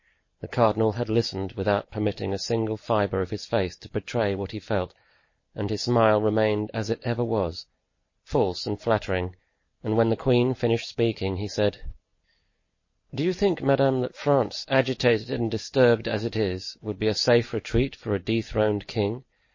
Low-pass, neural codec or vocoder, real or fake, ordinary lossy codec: 7.2 kHz; none; real; MP3, 32 kbps